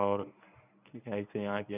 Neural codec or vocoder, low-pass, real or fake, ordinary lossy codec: codec, 24 kHz, 0.9 kbps, WavTokenizer, medium speech release version 1; 3.6 kHz; fake; none